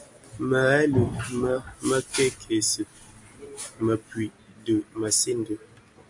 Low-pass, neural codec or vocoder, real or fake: 10.8 kHz; none; real